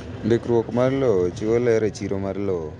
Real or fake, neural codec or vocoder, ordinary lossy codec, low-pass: real; none; none; 9.9 kHz